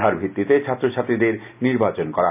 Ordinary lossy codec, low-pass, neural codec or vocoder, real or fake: none; 3.6 kHz; none; real